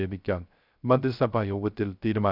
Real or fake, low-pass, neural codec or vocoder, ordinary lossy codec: fake; 5.4 kHz; codec, 16 kHz, 0.3 kbps, FocalCodec; none